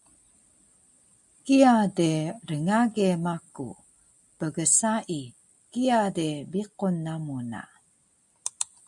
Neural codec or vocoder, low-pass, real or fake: none; 10.8 kHz; real